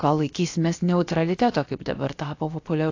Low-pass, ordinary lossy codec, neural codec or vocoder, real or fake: 7.2 kHz; AAC, 48 kbps; codec, 16 kHz, 0.7 kbps, FocalCodec; fake